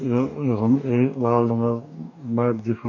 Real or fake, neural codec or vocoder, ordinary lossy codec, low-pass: fake; codec, 44.1 kHz, 2.6 kbps, DAC; none; 7.2 kHz